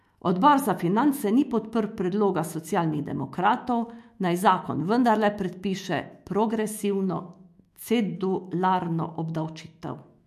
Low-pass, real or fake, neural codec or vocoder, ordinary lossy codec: 14.4 kHz; fake; autoencoder, 48 kHz, 128 numbers a frame, DAC-VAE, trained on Japanese speech; MP3, 64 kbps